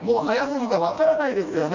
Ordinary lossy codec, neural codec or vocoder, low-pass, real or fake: none; codec, 16 kHz, 1 kbps, FreqCodec, smaller model; 7.2 kHz; fake